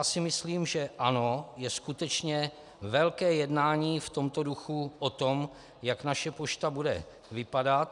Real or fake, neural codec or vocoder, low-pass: real; none; 10.8 kHz